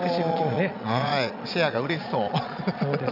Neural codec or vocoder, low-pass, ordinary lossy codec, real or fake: vocoder, 22.05 kHz, 80 mel bands, Vocos; 5.4 kHz; none; fake